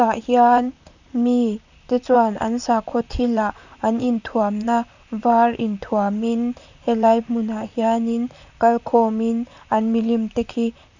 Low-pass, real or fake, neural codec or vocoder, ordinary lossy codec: 7.2 kHz; fake; vocoder, 44.1 kHz, 128 mel bands, Pupu-Vocoder; none